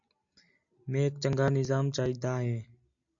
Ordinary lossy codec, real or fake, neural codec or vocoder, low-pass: AAC, 64 kbps; real; none; 7.2 kHz